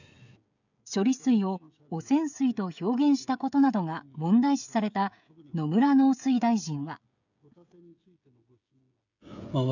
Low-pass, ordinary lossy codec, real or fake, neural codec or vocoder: 7.2 kHz; none; fake; codec, 16 kHz, 16 kbps, FreqCodec, smaller model